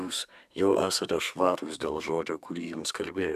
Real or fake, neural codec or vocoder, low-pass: fake; codec, 32 kHz, 1.9 kbps, SNAC; 14.4 kHz